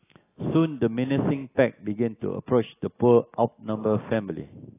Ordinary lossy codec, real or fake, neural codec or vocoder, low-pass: AAC, 16 kbps; real; none; 3.6 kHz